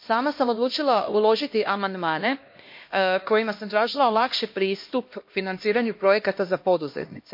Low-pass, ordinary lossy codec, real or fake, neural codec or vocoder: 5.4 kHz; MP3, 32 kbps; fake; codec, 16 kHz, 1 kbps, X-Codec, HuBERT features, trained on LibriSpeech